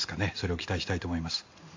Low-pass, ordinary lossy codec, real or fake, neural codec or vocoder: 7.2 kHz; AAC, 48 kbps; real; none